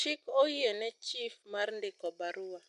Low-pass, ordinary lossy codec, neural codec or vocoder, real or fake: 10.8 kHz; none; none; real